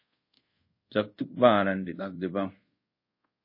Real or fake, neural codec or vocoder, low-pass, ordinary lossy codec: fake; codec, 24 kHz, 0.5 kbps, DualCodec; 5.4 kHz; MP3, 24 kbps